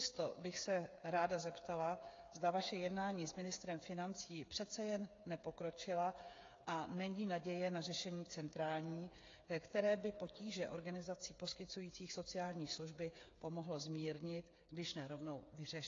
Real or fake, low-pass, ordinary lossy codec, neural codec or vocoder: fake; 7.2 kHz; AAC, 32 kbps; codec, 16 kHz, 8 kbps, FreqCodec, smaller model